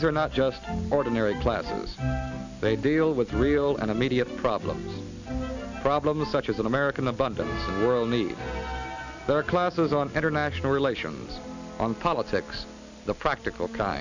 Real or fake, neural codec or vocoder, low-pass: real; none; 7.2 kHz